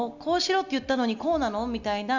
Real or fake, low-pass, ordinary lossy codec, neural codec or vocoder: real; 7.2 kHz; none; none